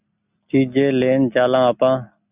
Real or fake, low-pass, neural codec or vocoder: real; 3.6 kHz; none